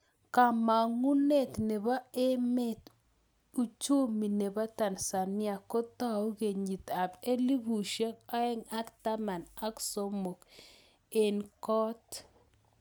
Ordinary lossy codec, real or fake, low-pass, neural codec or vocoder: none; real; none; none